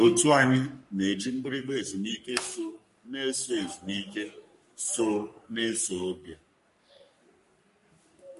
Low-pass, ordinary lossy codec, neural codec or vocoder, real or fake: 14.4 kHz; MP3, 48 kbps; codec, 44.1 kHz, 3.4 kbps, Pupu-Codec; fake